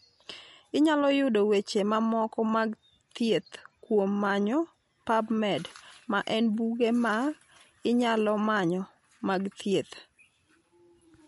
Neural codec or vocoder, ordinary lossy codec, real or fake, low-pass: none; MP3, 48 kbps; real; 14.4 kHz